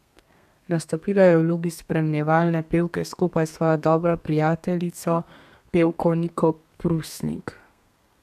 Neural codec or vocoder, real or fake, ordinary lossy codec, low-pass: codec, 32 kHz, 1.9 kbps, SNAC; fake; none; 14.4 kHz